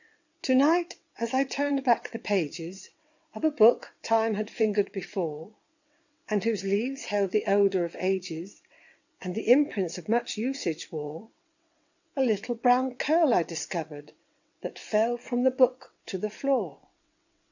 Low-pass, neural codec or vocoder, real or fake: 7.2 kHz; vocoder, 22.05 kHz, 80 mel bands, WaveNeXt; fake